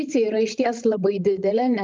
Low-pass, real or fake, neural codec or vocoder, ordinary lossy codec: 7.2 kHz; fake; codec, 16 kHz, 16 kbps, FreqCodec, larger model; Opus, 24 kbps